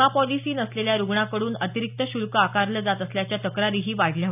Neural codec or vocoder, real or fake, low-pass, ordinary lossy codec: none; real; 3.6 kHz; none